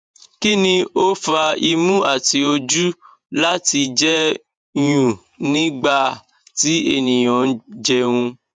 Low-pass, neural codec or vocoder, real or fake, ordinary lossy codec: 9.9 kHz; vocoder, 48 kHz, 128 mel bands, Vocos; fake; none